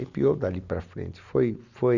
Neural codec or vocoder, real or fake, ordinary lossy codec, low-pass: none; real; none; 7.2 kHz